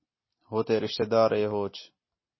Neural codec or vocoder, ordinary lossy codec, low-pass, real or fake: none; MP3, 24 kbps; 7.2 kHz; real